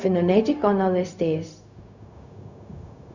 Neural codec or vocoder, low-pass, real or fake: codec, 16 kHz, 0.4 kbps, LongCat-Audio-Codec; 7.2 kHz; fake